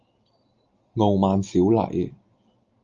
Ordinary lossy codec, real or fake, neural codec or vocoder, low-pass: Opus, 32 kbps; real; none; 7.2 kHz